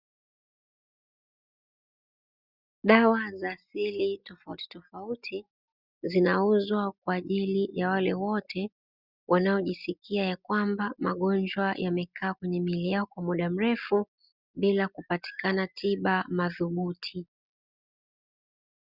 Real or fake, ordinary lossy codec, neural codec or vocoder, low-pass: real; Opus, 64 kbps; none; 5.4 kHz